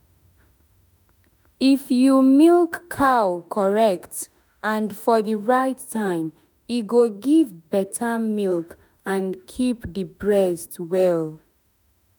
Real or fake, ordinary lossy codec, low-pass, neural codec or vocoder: fake; none; none; autoencoder, 48 kHz, 32 numbers a frame, DAC-VAE, trained on Japanese speech